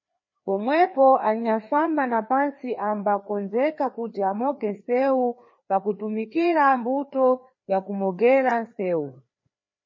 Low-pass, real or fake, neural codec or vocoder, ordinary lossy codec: 7.2 kHz; fake; codec, 16 kHz, 2 kbps, FreqCodec, larger model; MP3, 32 kbps